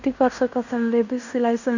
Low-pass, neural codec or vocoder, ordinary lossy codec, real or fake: 7.2 kHz; codec, 16 kHz in and 24 kHz out, 0.9 kbps, LongCat-Audio-Codec, fine tuned four codebook decoder; none; fake